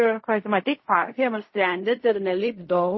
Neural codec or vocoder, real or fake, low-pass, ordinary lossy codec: codec, 16 kHz in and 24 kHz out, 0.4 kbps, LongCat-Audio-Codec, fine tuned four codebook decoder; fake; 7.2 kHz; MP3, 24 kbps